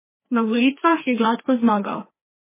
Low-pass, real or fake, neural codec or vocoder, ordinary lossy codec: 3.6 kHz; fake; codec, 16 kHz, 2 kbps, FreqCodec, larger model; MP3, 16 kbps